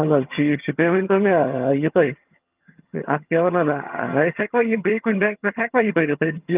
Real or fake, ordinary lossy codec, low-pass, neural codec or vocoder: fake; Opus, 24 kbps; 3.6 kHz; vocoder, 22.05 kHz, 80 mel bands, HiFi-GAN